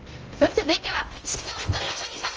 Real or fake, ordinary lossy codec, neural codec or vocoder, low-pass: fake; Opus, 24 kbps; codec, 16 kHz in and 24 kHz out, 0.6 kbps, FocalCodec, streaming, 2048 codes; 7.2 kHz